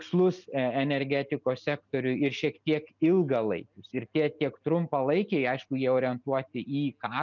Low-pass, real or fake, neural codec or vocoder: 7.2 kHz; real; none